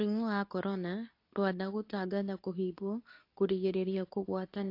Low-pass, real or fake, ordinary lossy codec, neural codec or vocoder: 5.4 kHz; fake; AAC, 32 kbps; codec, 24 kHz, 0.9 kbps, WavTokenizer, medium speech release version 2